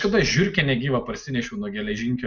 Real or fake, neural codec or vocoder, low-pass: real; none; 7.2 kHz